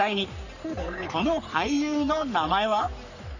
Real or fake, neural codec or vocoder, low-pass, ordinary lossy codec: fake; codec, 44.1 kHz, 3.4 kbps, Pupu-Codec; 7.2 kHz; none